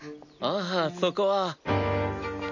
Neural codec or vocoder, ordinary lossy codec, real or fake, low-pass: none; none; real; 7.2 kHz